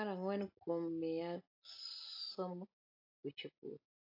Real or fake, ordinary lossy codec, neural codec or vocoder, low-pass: real; AAC, 48 kbps; none; 5.4 kHz